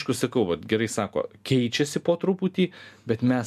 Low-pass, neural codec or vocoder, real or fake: 14.4 kHz; none; real